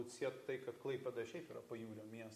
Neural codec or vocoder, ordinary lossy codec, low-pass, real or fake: none; AAC, 64 kbps; 14.4 kHz; real